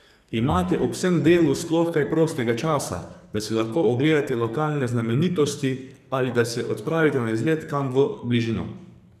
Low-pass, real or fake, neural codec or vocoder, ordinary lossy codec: 14.4 kHz; fake; codec, 44.1 kHz, 2.6 kbps, SNAC; none